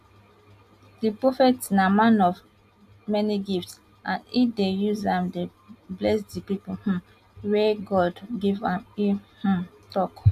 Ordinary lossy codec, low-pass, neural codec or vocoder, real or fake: AAC, 96 kbps; 14.4 kHz; none; real